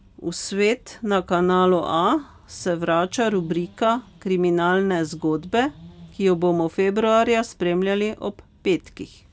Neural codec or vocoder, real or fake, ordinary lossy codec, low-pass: none; real; none; none